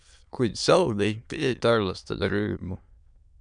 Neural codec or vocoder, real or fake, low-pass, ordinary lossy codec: autoencoder, 22.05 kHz, a latent of 192 numbers a frame, VITS, trained on many speakers; fake; 9.9 kHz; MP3, 96 kbps